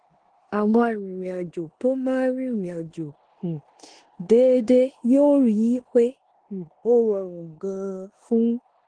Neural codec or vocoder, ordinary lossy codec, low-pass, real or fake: codec, 16 kHz in and 24 kHz out, 0.9 kbps, LongCat-Audio-Codec, four codebook decoder; Opus, 16 kbps; 9.9 kHz; fake